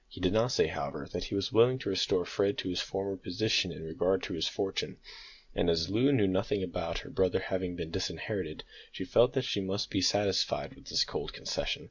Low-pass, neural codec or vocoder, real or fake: 7.2 kHz; none; real